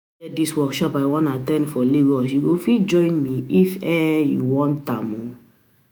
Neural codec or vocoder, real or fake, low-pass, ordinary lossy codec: autoencoder, 48 kHz, 128 numbers a frame, DAC-VAE, trained on Japanese speech; fake; none; none